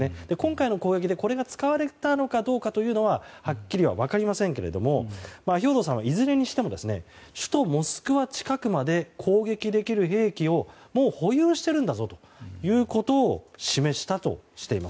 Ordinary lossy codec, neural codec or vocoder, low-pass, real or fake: none; none; none; real